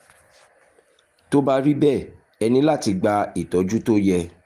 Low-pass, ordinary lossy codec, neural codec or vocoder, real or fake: 14.4 kHz; Opus, 24 kbps; none; real